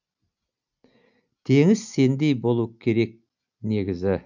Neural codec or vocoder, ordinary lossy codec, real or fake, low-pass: none; none; real; 7.2 kHz